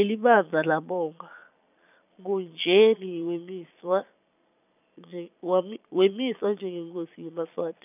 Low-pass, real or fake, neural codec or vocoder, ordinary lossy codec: 3.6 kHz; fake; vocoder, 22.05 kHz, 80 mel bands, Vocos; none